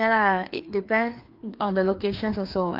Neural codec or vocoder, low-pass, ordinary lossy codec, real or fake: codec, 16 kHz, 2 kbps, FreqCodec, larger model; 5.4 kHz; Opus, 32 kbps; fake